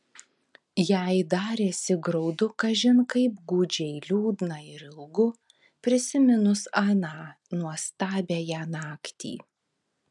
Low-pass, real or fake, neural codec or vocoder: 10.8 kHz; real; none